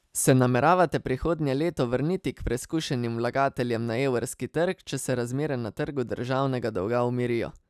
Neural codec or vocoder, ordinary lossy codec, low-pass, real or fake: none; none; 14.4 kHz; real